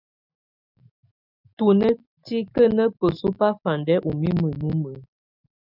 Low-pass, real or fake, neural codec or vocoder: 5.4 kHz; real; none